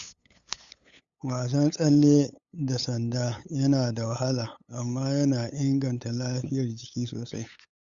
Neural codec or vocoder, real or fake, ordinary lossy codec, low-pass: codec, 16 kHz, 8 kbps, FunCodec, trained on LibriTTS, 25 frames a second; fake; Opus, 64 kbps; 7.2 kHz